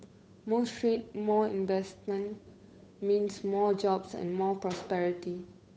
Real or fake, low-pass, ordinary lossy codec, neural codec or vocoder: fake; none; none; codec, 16 kHz, 8 kbps, FunCodec, trained on Chinese and English, 25 frames a second